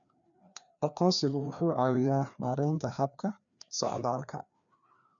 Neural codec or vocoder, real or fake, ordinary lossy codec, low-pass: codec, 16 kHz, 2 kbps, FreqCodec, larger model; fake; none; 7.2 kHz